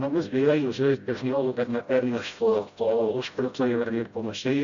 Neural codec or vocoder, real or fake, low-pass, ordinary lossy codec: codec, 16 kHz, 0.5 kbps, FreqCodec, smaller model; fake; 7.2 kHz; Opus, 64 kbps